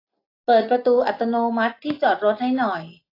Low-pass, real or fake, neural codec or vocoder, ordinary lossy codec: 5.4 kHz; real; none; AAC, 32 kbps